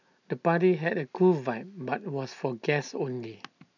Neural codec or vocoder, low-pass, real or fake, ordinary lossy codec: none; 7.2 kHz; real; none